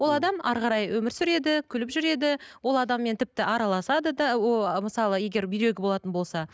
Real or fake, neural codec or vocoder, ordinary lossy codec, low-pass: real; none; none; none